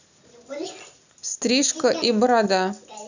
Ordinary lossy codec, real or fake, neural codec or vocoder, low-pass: none; real; none; 7.2 kHz